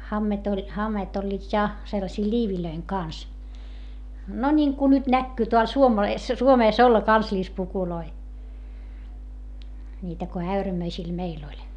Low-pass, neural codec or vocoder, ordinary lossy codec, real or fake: 10.8 kHz; none; none; real